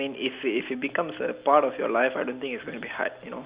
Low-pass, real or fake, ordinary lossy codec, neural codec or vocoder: 3.6 kHz; real; Opus, 64 kbps; none